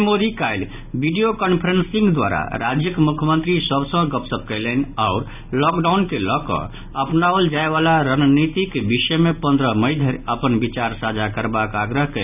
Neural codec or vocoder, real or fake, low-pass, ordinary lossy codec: none; real; 3.6 kHz; none